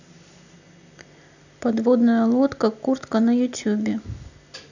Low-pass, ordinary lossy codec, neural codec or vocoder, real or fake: 7.2 kHz; none; none; real